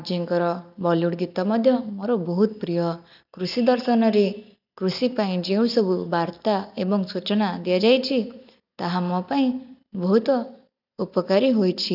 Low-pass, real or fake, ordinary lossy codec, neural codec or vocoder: 5.4 kHz; real; none; none